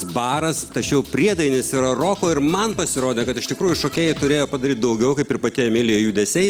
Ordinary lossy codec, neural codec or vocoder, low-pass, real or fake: Opus, 24 kbps; none; 19.8 kHz; real